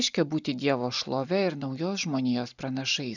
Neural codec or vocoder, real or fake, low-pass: none; real; 7.2 kHz